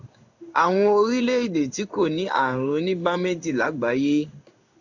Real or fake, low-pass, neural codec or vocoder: fake; 7.2 kHz; codec, 16 kHz in and 24 kHz out, 1 kbps, XY-Tokenizer